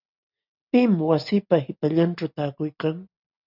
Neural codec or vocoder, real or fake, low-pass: none; real; 5.4 kHz